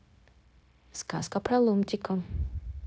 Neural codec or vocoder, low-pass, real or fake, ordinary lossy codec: codec, 16 kHz, 0.9 kbps, LongCat-Audio-Codec; none; fake; none